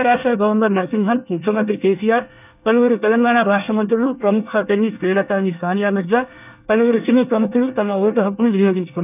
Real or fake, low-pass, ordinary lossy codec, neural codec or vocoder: fake; 3.6 kHz; none; codec, 24 kHz, 1 kbps, SNAC